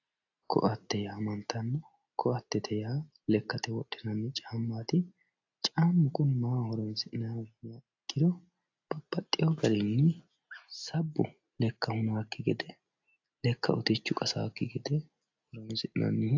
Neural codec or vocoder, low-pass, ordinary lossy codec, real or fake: none; 7.2 kHz; AAC, 48 kbps; real